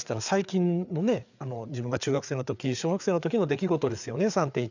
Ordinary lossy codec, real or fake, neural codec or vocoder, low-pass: none; fake; codec, 16 kHz, 4 kbps, FreqCodec, larger model; 7.2 kHz